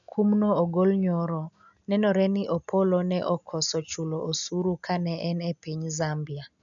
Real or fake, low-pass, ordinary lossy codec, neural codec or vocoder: real; 7.2 kHz; none; none